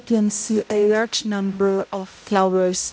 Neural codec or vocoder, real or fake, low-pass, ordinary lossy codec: codec, 16 kHz, 0.5 kbps, X-Codec, HuBERT features, trained on balanced general audio; fake; none; none